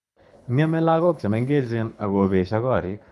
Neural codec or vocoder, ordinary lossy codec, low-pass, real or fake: codec, 24 kHz, 6 kbps, HILCodec; none; none; fake